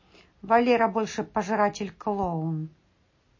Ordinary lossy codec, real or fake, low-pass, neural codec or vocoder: MP3, 32 kbps; real; 7.2 kHz; none